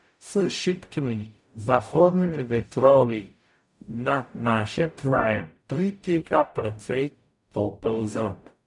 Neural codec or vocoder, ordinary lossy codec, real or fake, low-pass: codec, 44.1 kHz, 0.9 kbps, DAC; none; fake; 10.8 kHz